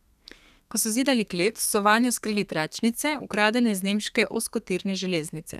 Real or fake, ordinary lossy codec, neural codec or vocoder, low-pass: fake; none; codec, 32 kHz, 1.9 kbps, SNAC; 14.4 kHz